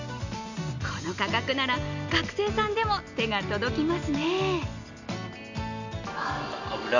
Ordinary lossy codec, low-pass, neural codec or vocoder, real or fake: none; 7.2 kHz; none; real